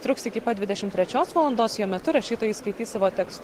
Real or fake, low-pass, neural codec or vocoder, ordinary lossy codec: real; 19.8 kHz; none; Opus, 16 kbps